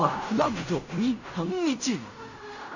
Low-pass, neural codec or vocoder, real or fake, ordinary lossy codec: 7.2 kHz; codec, 16 kHz in and 24 kHz out, 0.4 kbps, LongCat-Audio-Codec, fine tuned four codebook decoder; fake; none